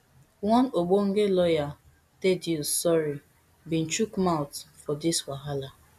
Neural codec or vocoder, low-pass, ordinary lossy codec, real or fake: none; 14.4 kHz; none; real